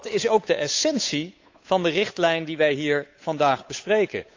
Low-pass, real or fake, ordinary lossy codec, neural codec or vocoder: 7.2 kHz; fake; AAC, 48 kbps; codec, 16 kHz, 8 kbps, FunCodec, trained on Chinese and English, 25 frames a second